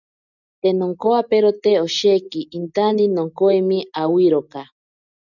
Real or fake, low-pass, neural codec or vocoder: real; 7.2 kHz; none